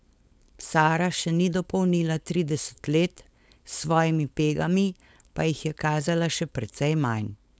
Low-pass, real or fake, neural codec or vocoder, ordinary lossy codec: none; fake; codec, 16 kHz, 4.8 kbps, FACodec; none